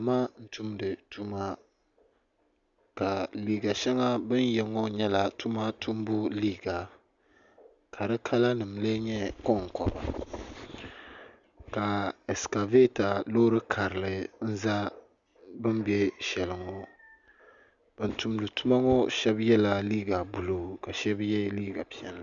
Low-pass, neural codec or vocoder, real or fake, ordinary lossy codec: 7.2 kHz; none; real; Opus, 64 kbps